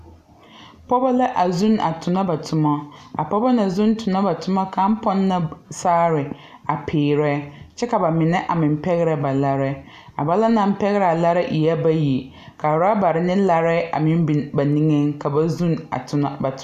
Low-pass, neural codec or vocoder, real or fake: 14.4 kHz; none; real